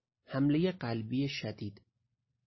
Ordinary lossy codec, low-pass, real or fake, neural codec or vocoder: MP3, 24 kbps; 7.2 kHz; real; none